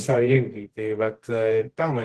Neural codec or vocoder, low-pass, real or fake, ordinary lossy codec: codec, 24 kHz, 0.9 kbps, WavTokenizer, medium music audio release; 10.8 kHz; fake; Opus, 16 kbps